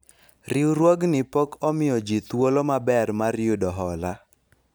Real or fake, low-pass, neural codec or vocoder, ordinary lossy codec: real; none; none; none